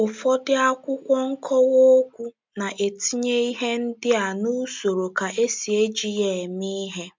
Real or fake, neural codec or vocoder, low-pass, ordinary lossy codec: real; none; 7.2 kHz; MP3, 64 kbps